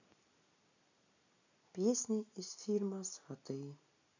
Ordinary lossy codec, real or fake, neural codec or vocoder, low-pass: none; real; none; 7.2 kHz